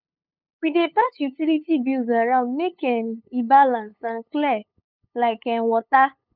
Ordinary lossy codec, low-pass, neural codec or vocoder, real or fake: none; 5.4 kHz; codec, 16 kHz, 8 kbps, FunCodec, trained on LibriTTS, 25 frames a second; fake